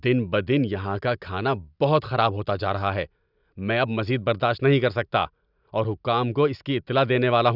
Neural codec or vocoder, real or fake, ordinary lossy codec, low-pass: none; real; none; 5.4 kHz